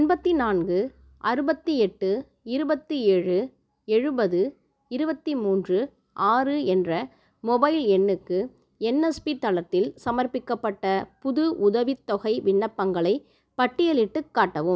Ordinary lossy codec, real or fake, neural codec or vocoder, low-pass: none; real; none; none